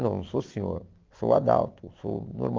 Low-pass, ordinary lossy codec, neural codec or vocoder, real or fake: 7.2 kHz; Opus, 32 kbps; none; real